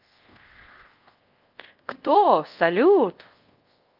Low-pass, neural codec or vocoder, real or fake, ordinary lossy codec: 5.4 kHz; codec, 24 kHz, 0.5 kbps, DualCodec; fake; Opus, 32 kbps